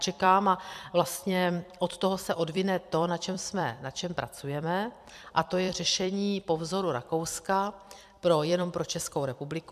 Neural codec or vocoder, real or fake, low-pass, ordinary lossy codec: vocoder, 44.1 kHz, 128 mel bands every 256 samples, BigVGAN v2; fake; 14.4 kHz; AAC, 96 kbps